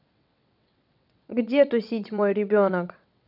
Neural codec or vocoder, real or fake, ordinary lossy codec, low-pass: vocoder, 22.05 kHz, 80 mel bands, WaveNeXt; fake; none; 5.4 kHz